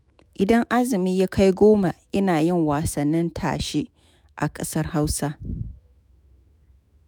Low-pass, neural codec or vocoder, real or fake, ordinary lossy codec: none; autoencoder, 48 kHz, 128 numbers a frame, DAC-VAE, trained on Japanese speech; fake; none